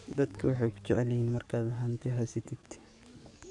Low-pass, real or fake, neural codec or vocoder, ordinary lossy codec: 10.8 kHz; fake; codec, 44.1 kHz, 7.8 kbps, DAC; none